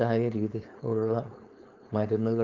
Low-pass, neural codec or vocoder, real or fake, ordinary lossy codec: 7.2 kHz; codec, 16 kHz, 4.8 kbps, FACodec; fake; Opus, 32 kbps